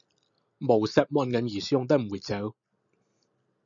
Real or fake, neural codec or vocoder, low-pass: real; none; 7.2 kHz